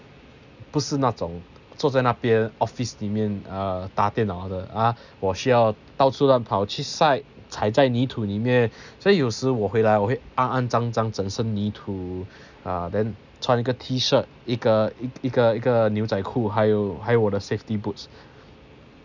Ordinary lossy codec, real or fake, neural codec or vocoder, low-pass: none; real; none; 7.2 kHz